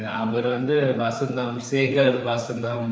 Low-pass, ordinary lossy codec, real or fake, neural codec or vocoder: none; none; fake; codec, 16 kHz, 4 kbps, FreqCodec, larger model